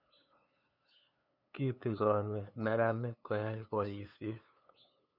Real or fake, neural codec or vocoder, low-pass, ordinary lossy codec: fake; codec, 16 kHz, 2 kbps, FunCodec, trained on LibriTTS, 25 frames a second; 5.4 kHz; none